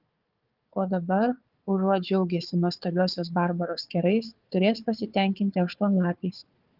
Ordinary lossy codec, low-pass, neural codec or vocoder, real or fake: Opus, 32 kbps; 5.4 kHz; codec, 16 kHz, 4 kbps, FunCodec, trained on Chinese and English, 50 frames a second; fake